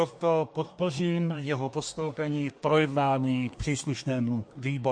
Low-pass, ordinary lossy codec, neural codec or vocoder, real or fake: 9.9 kHz; MP3, 48 kbps; codec, 24 kHz, 1 kbps, SNAC; fake